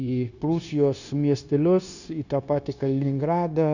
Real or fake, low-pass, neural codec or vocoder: fake; 7.2 kHz; codec, 16 kHz, 0.9 kbps, LongCat-Audio-Codec